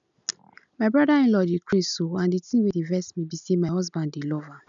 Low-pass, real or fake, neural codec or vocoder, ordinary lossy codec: 7.2 kHz; real; none; none